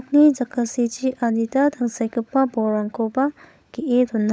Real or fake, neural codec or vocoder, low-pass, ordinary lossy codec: fake; codec, 16 kHz, 16 kbps, FunCodec, trained on LibriTTS, 50 frames a second; none; none